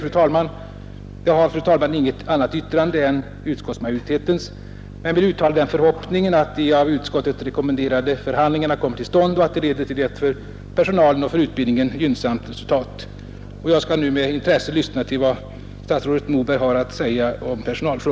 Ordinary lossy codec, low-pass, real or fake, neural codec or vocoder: none; none; real; none